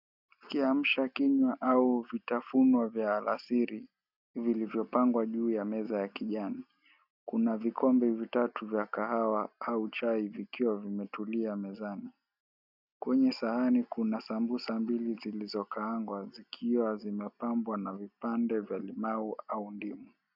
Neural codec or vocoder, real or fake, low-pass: none; real; 5.4 kHz